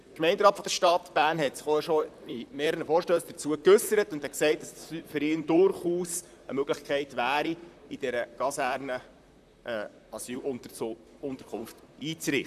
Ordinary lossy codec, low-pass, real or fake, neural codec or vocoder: none; 14.4 kHz; fake; vocoder, 44.1 kHz, 128 mel bands, Pupu-Vocoder